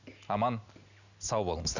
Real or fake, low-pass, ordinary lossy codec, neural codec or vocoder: real; 7.2 kHz; none; none